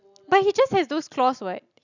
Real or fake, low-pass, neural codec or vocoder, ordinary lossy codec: real; 7.2 kHz; none; none